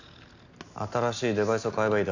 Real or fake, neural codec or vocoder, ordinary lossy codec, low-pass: real; none; none; 7.2 kHz